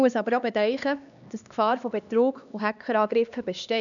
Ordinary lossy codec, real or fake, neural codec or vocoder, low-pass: none; fake; codec, 16 kHz, 2 kbps, X-Codec, HuBERT features, trained on LibriSpeech; 7.2 kHz